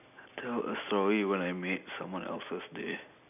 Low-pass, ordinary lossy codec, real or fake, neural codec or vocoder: 3.6 kHz; none; real; none